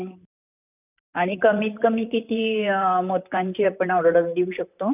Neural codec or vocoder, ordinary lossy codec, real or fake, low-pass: vocoder, 44.1 kHz, 128 mel bands, Pupu-Vocoder; none; fake; 3.6 kHz